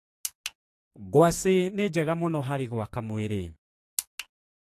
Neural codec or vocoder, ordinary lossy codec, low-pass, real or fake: codec, 44.1 kHz, 2.6 kbps, SNAC; AAC, 64 kbps; 14.4 kHz; fake